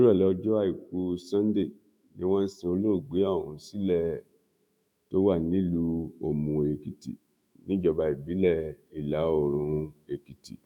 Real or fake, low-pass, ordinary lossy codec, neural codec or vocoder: fake; 19.8 kHz; none; autoencoder, 48 kHz, 128 numbers a frame, DAC-VAE, trained on Japanese speech